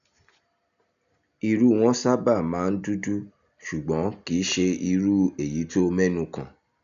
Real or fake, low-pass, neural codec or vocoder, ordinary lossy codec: real; 7.2 kHz; none; none